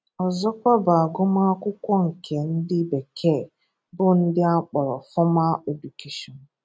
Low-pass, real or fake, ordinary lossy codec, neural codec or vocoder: none; real; none; none